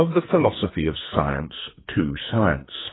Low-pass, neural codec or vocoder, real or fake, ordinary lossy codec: 7.2 kHz; codec, 44.1 kHz, 2.6 kbps, SNAC; fake; AAC, 16 kbps